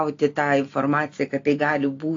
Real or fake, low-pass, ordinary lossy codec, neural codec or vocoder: real; 7.2 kHz; AAC, 48 kbps; none